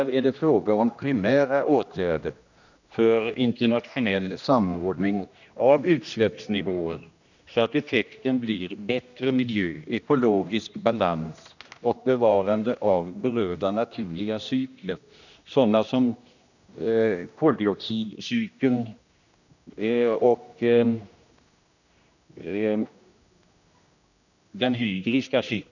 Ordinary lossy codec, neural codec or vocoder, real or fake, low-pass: none; codec, 16 kHz, 1 kbps, X-Codec, HuBERT features, trained on general audio; fake; 7.2 kHz